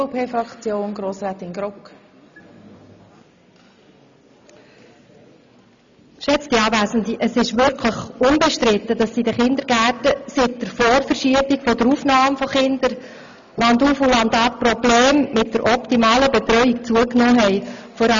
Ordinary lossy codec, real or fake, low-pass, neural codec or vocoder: MP3, 64 kbps; real; 7.2 kHz; none